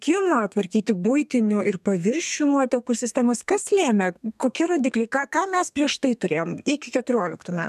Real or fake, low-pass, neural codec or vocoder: fake; 14.4 kHz; codec, 32 kHz, 1.9 kbps, SNAC